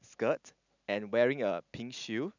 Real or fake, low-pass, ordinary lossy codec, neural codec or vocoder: real; 7.2 kHz; none; none